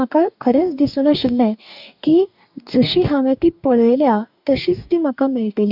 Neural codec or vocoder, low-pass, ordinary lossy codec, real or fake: codec, 44.1 kHz, 2.6 kbps, DAC; 5.4 kHz; none; fake